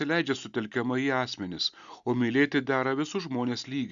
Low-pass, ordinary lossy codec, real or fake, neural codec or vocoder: 7.2 kHz; Opus, 64 kbps; real; none